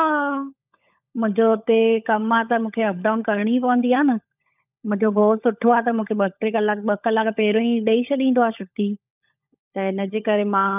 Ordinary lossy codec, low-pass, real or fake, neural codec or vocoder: none; 3.6 kHz; fake; codec, 16 kHz, 16 kbps, FunCodec, trained on LibriTTS, 50 frames a second